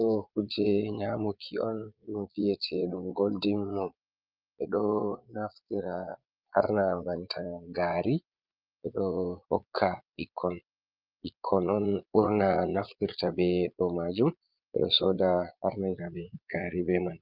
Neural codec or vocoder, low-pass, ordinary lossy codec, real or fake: vocoder, 22.05 kHz, 80 mel bands, Vocos; 5.4 kHz; Opus, 24 kbps; fake